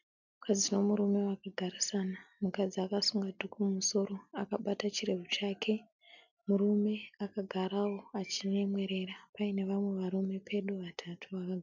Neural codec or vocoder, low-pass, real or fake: none; 7.2 kHz; real